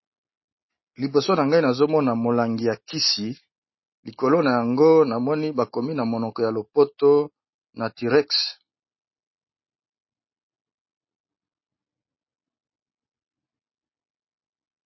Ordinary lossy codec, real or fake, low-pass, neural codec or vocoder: MP3, 24 kbps; real; 7.2 kHz; none